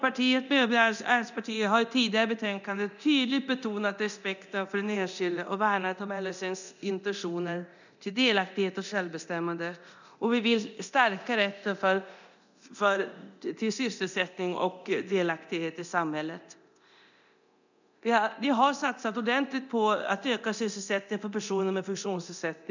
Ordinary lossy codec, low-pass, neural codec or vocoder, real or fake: none; 7.2 kHz; codec, 24 kHz, 0.9 kbps, DualCodec; fake